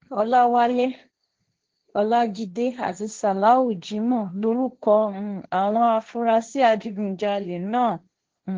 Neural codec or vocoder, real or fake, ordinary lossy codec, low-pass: codec, 16 kHz, 1.1 kbps, Voila-Tokenizer; fake; Opus, 16 kbps; 7.2 kHz